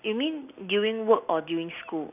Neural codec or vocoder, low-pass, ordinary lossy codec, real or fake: none; 3.6 kHz; none; real